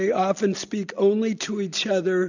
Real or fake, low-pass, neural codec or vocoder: real; 7.2 kHz; none